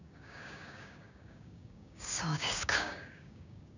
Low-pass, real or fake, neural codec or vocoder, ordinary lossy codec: 7.2 kHz; fake; codec, 16 kHz, 6 kbps, DAC; none